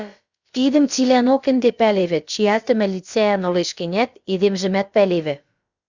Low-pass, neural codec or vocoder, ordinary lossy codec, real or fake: 7.2 kHz; codec, 16 kHz, about 1 kbps, DyCAST, with the encoder's durations; Opus, 64 kbps; fake